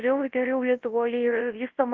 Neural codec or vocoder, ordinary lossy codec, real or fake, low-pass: codec, 24 kHz, 0.9 kbps, WavTokenizer, large speech release; Opus, 16 kbps; fake; 7.2 kHz